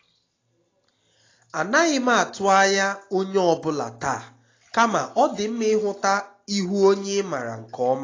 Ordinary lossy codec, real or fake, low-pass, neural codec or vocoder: AAC, 32 kbps; real; 7.2 kHz; none